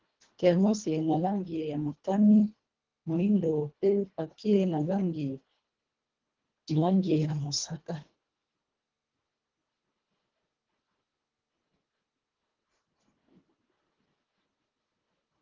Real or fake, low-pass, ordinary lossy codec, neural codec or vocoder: fake; 7.2 kHz; Opus, 16 kbps; codec, 24 kHz, 1.5 kbps, HILCodec